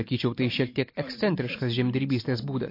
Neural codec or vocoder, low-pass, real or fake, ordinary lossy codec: none; 5.4 kHz; real; MP3, 32 kbps